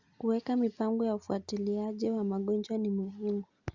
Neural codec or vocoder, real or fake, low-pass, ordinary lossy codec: none; real; 7.2 kHz; Opus, 64 kbps